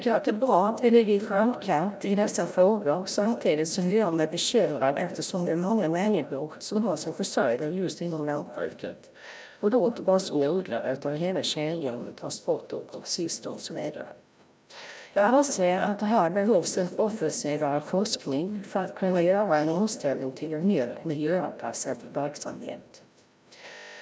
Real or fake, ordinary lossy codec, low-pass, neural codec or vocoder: fake; none; none; codec, 16 kHz, 0.5 kbps, FreqCodec, larger model